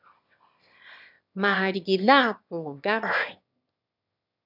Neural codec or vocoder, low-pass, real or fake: autoencoder, 22.05 kHz, a latent of 192 numbers a frame, VITS, trained on one speaker; 5.4 kHz; fake